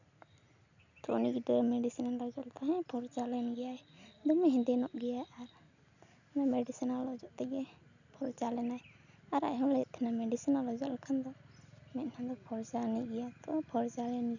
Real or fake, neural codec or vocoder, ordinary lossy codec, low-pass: real; none; none; 7.2 kHz